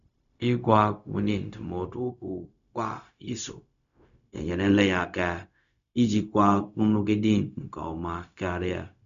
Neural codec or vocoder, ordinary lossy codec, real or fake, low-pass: codec, 16 kHz, 0.4 kbps, LongCat-Audio-Codec; none; fake; 7.2 kHz